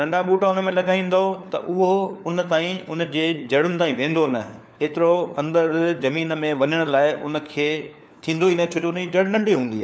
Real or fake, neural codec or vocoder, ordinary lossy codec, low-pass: fake; codec, 16 kHz, 4 kbps, FunCodec, trained on LibriTTS, 50 frames a second; none; none